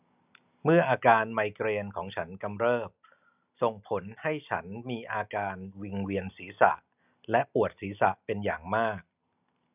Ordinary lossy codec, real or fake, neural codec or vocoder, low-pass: none; real; none; 3.6 kHz